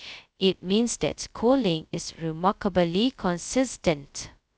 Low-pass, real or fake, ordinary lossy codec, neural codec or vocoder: none; fake; none; codec, 16 kHz, 0.2 kbps, FocalCodec